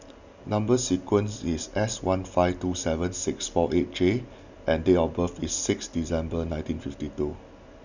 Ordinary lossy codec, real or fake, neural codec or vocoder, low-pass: none; real; none; 7.2 kHz